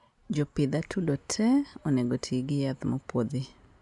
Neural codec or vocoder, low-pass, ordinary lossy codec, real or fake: none; 10.8 kHz; none; real